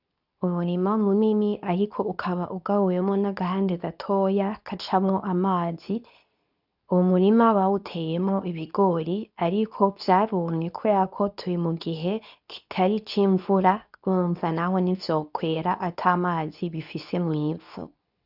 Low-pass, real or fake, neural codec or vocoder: 5.4 kHz; fake; codec, 24 kHz, 0.9 kbps, WavTokenizer, medium speech release version 2